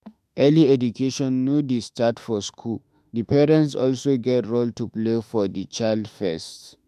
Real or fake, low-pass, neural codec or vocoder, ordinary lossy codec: fake; 14.4 kHz; autoencoder, 48 kHz, 32 numbers a frame, DAC-VAE, trained on Japanese speech; MP3, 96 kbps